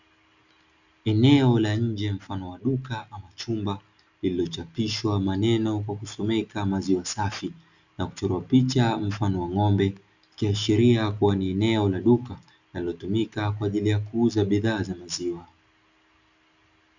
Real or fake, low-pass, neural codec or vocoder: real; 7.2 kHz; none